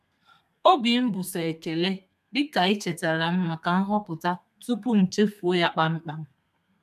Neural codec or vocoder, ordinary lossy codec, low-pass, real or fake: codec, 32 kHz, 1.9 kbps, SNAC; none; 14.4 kHz; fake